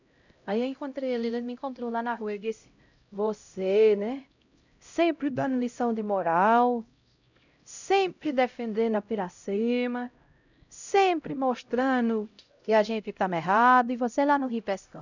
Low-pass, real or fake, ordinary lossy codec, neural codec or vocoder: 7.2 kHz; fake; none; codec, 16 kHz, 0.5 kbps, X-Codec, HuBERT features, trained on LibriSpeech